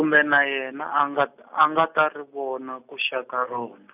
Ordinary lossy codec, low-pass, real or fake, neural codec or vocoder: none; 3.6 kHz; real; none